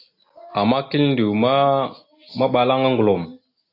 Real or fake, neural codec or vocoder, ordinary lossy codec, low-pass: real; none; AAC, 32 kbps; 5.4 kHz